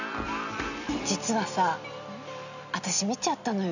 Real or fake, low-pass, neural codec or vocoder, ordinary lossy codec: real; 7.2 kHz; none; none